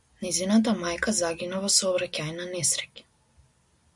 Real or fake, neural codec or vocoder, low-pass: real; none; 10.8 kHz